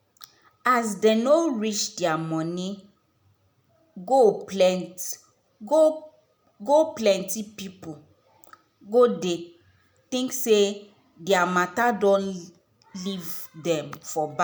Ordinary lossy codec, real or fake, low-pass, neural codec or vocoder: none; real; none; none